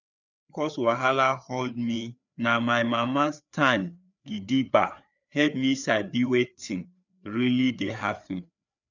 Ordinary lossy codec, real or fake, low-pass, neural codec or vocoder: none; fake; 7.2 kHz; codec, 16 kHz, 4 kbps, FreqCodec, larger model